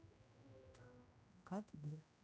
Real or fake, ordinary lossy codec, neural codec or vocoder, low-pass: fake; none; codec, 16 kHz, 0.5 kbps, X-Codec, HuBERT features, trained on general audio; none